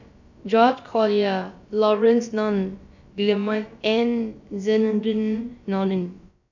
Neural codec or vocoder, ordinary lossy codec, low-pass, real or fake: codec, 16 kHz, about 1 kbps, DyCAST, with the encoder's durations; none; 7.2 kHz; fake